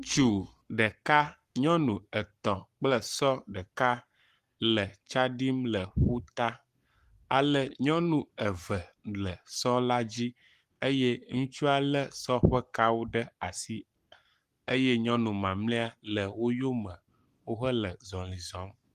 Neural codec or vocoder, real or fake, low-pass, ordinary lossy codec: codec, 44.1 kHz, 7.8 kbps, Pupu-Codec; fake; 14.4 kHz; Opus, 24 kbps